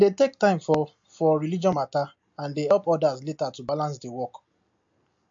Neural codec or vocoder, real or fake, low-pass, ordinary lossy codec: none; real; 7.2 kHz; MP3, 48 kbps